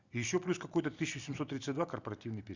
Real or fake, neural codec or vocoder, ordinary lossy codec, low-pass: real; none; Opus, 64 kbps; 7.2 kHz